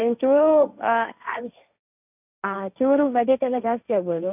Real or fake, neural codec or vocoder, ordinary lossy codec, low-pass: fake; codec, 16 kHz, 1.1 kbps, Voila-Tokenizer; none; 3.6 kHz